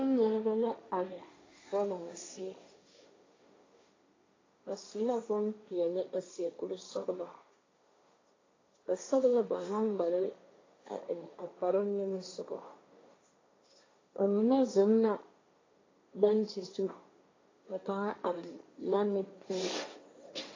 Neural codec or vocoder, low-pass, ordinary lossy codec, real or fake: codec, 16 kHz, 1.1 kbps, Voila-Tokenizer; 7.2 kHz; AAC, 32 kbps; fake